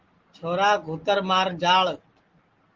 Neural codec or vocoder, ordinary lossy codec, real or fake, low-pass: none; Opus, 16 kbps; real; 7.2 kHz